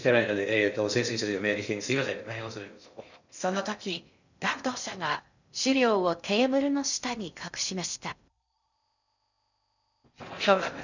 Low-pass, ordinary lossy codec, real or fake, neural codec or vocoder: 7.2 kHz; none; fake; codec, 16 kHz in and 24 kHz out, 0.6 kbps, FocalCodec, streaming, 2048 codes